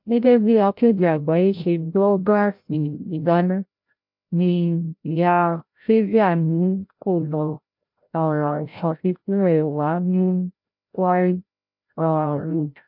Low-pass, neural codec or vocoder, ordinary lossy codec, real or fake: 5.4 kHz; codec, 16 kHz, 0.5 kbps, FreqCodec, larger model; MP3, 48 kbps; fake